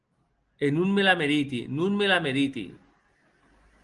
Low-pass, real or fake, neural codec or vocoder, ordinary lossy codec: 10.8 kHz; real; none; Opus, 24 kbps